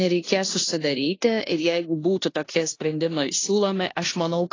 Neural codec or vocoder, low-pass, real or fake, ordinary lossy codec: codec, 16 kHz in and 24 kHz out, 0.9 kbps, LongCat-Audio-Codec, four codebook decoder; 7.2 kHz; fake; AAC, 32 kbps